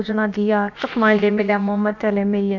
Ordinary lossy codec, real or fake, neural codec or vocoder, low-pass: AAC, 48 kbps; fake; codec, 16 kHz, about 1 kbps, DyCAST, with the encoder's durations; 7.2 kHz